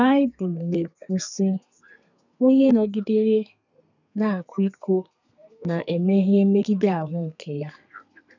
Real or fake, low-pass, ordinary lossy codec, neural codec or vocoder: fake; 7.2 kHz; none; codec, 32 kHz, 1.9 kbps, SNAC